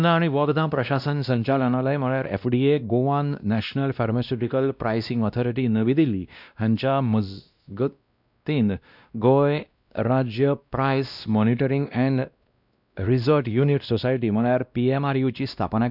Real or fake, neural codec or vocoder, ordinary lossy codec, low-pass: fake; codec, 16 kHz, 1 kbps, X-Codec, WavLM features, trained on Multilingual LibriSpeech; none; 5.4 kHz